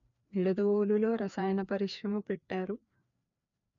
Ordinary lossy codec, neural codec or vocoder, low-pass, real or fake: none; codec, 16 kHz, 2 kbps, FreqCodec, larger model; 7.2 kHz; fake